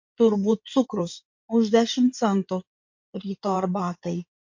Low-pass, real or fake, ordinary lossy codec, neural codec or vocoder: 7.2 kHz; fake; MP3, 48 kbps; codec, 16 kHz in and 24 kHz out, 2.2 kbps, FireRedTTS-2 codec